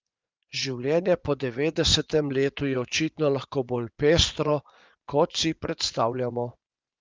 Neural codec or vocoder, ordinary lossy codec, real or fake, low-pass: vocoder, 24 kHz, 100 mel bands, Vocos; Opus, 32 kbps; fake; 7.2 kHz